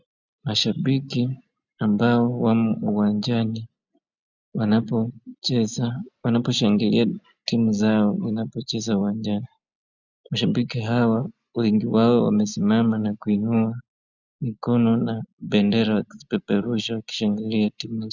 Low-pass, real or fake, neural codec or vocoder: 7.2 kHz; real; none